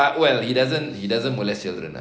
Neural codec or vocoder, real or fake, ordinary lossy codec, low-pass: none; real; none; none